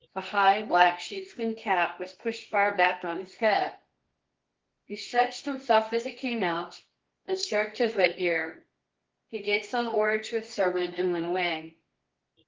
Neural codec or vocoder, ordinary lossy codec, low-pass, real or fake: codec, 24 kHz, 0.9 kbps, WavTokenizer, medium music audio release; Opus, 16 kbps; 7.2 kHz; fake